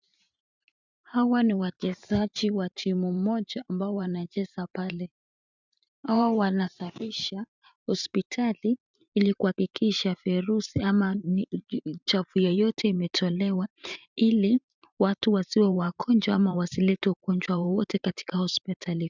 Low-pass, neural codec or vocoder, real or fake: 7.2 kHz; vocoder, 44.1 kHz, 128 mel bands every 512 samples, BigVGAN v2; fake